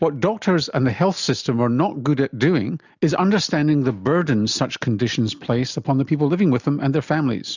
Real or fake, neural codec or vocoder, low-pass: real; none; 7.2 kHz